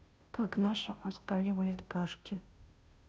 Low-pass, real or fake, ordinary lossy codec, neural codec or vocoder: none; fake; none; codec, 16 kHz, 0.5 kbps, FunCodec, trained on Chinese and English, 25 frames a second